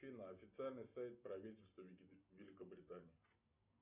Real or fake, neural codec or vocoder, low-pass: real; none; 3.6 kHz